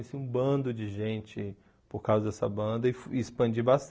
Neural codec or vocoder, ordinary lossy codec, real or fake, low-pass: none; none; real; none